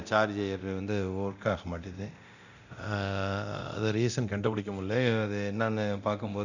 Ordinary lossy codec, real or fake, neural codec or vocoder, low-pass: none; fake; codec, 24 kHz, 0.9 kbps, DualCodec; 7.2 kHz